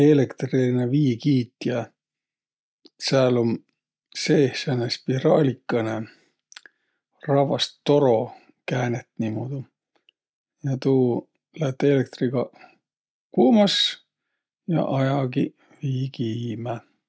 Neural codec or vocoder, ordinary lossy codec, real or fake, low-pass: none; none; real; none